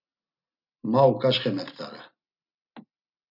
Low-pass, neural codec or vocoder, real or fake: 5.4 kHz; none; real